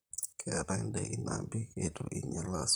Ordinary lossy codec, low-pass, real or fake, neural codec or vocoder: none; none; fake; vocoder, 44.1 kHz, 128 mel bands, Pupu-Vocoder